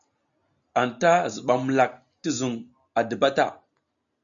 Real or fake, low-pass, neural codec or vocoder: real; 7.2 kHz; none